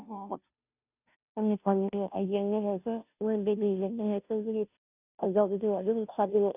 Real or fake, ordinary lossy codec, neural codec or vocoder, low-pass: fake; none; codec, 16 kHz, 0.5 kbps, FunCodec, trained on Chinese and English, 25 frames a second; 3.6 kHz